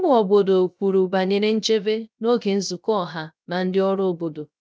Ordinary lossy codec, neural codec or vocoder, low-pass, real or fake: none; codec, 16 kHz, 0.3 kbps, FocalCodec; none; fake